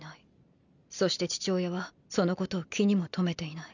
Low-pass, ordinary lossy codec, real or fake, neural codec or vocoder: 7.2 kHz; none; real; none